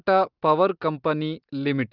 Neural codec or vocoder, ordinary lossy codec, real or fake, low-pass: none; Opus, 32 kbps; real; 5.4 kHz